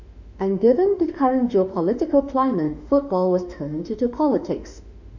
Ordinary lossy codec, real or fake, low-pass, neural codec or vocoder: none; fake; 7.2 kHz; autoencoder, 48 kHz, 32 numbers a frame, DAC-VAE, trained on Japanese speech